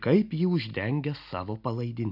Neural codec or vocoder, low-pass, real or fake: none; 5.4 kHz; real